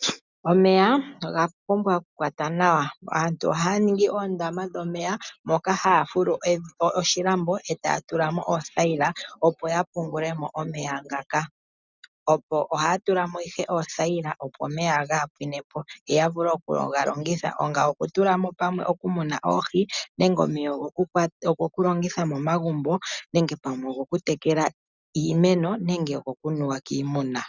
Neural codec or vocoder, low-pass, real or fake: none; 7.2 kHz; real